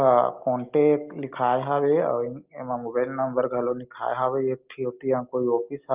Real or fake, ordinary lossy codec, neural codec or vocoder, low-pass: real; Opus, 24 kbps; none; 3.6 kHz